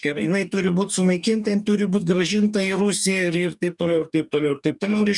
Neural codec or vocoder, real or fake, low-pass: codec, 44.1 kHz, 2.6 kbps, DAC; fake; 10.8 kHz